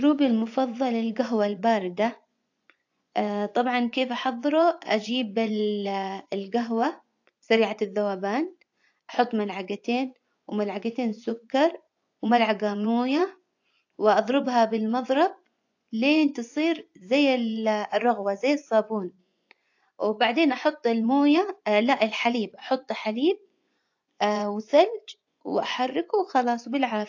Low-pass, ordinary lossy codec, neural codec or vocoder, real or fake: 7.2 kHz; AAC, 48 kbps; vocoder, 24 kHz, 100 mel bands, Vocos; fake